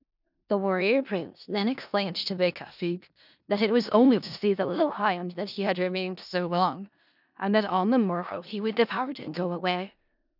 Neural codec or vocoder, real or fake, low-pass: codec, 16 kHz in and 24 kHz out, 0.4 kbps, LongCat-Audio-Codec, four codebook decoder; fake; 5.4 kHz